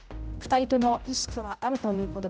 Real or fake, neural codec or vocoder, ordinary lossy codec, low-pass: fake; codec, 16 kHz, 0.5 kbps, X-Codec, HuBERT features, trained on general audio; none; none